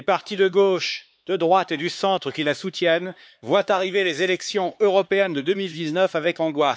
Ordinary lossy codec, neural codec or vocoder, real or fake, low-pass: none; codec, 16 kHz, 4 kbps, X-Codec, HuBERT features, trained on LibriSpeech; fake; none